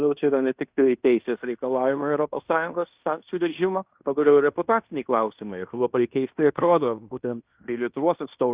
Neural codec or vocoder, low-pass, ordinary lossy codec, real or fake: codec, 16 kHz in and 24 kHz out, 0.9 kbps, LongCat-Audio-Codec, fine tuned four codebook decoder; 3.6 kHz; Opus, 64 kbps; fake